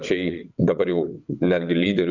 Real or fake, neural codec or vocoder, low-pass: fake; vocoder, 22.05 kHz, 80 mel bands, WaveNeXt; 7.2 kHz